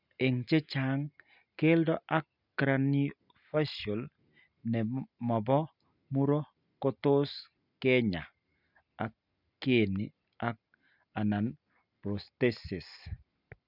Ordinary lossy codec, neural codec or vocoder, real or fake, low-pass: none; none; real; 5.4 kHz